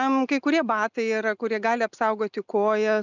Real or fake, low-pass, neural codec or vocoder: real; 7.2 kHz; none